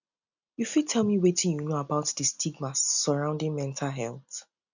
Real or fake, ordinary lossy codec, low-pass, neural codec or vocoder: real; none; 7.2 kHz; none